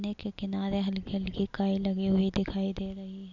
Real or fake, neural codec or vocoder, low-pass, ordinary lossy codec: real; none; 7.2 kHz; none